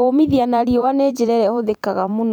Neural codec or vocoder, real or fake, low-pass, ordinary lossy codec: vocoder, 44.1 kHz, 128 mel bands every 512 samples, BigVGAN v2; fake; 19.8 kHz; none